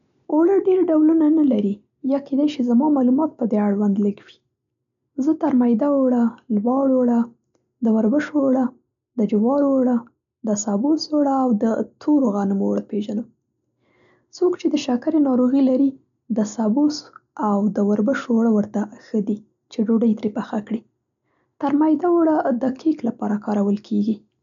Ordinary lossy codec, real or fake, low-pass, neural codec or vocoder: none; real; 7.2 kHz; none